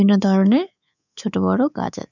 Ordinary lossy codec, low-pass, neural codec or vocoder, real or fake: none; 7.2 kHz; codec, 24 kHz, 3.1 kbps, DualCodec; fake